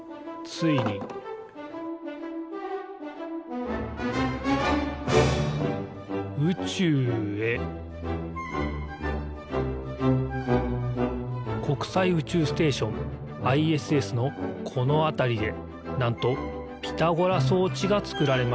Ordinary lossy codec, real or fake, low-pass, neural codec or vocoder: none; real; none; none